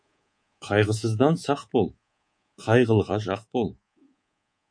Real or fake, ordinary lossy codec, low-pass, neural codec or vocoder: fake; MP3, 48 kbps; 9.9 kHz; codec, 24 kHz, 3.1 kbps, DualCodec